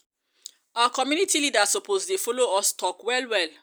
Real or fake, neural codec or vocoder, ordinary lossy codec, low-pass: real; none; none; none